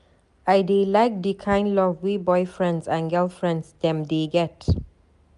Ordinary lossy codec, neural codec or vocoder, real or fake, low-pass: MP3, 96 kbps; none; real; 10.8 kHz